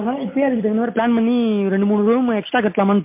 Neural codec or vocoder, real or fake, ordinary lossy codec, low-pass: none; real; MP3, 24 kbps; 3.6 kHz